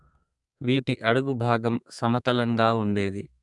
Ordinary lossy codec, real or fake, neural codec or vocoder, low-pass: none; fake; codec, 32 kHz, 1.9 kbps, SNAC; 10.8 kHz